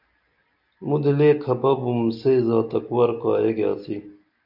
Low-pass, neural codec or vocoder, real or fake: 5.4 kHz; none; real